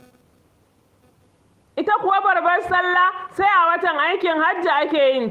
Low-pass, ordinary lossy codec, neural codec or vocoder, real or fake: 14.4 kHz; Opus, 24 kbps; none; real